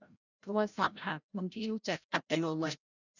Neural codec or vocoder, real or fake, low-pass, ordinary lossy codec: codec, 16 kHz, 0.5 kbps, FreqCodec, larger model; fake; 7.2 kHz; none